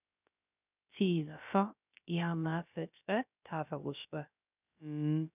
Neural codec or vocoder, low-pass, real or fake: codec, 16 kHz, 0.3 kbps, FocalCodec; 3.6 kHz; fake